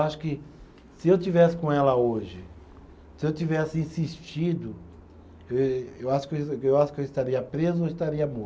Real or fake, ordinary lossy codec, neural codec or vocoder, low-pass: real; none; none; none